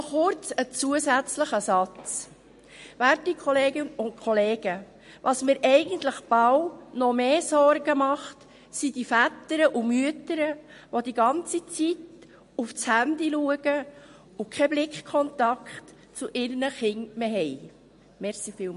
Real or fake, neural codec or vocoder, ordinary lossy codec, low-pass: real; none; MP3, 48 kbps; 10.8 kHz